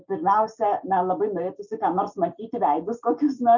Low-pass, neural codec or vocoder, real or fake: 7.2 kHz; none; real